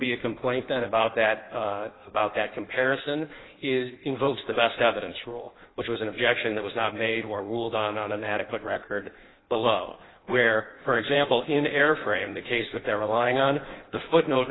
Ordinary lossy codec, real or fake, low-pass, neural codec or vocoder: AAC, 16 kbps; fake; 7.2 kHz; codec, 16 kHz in and 24 kHz out, 1.1 kbps, FireRedTTS-2 codec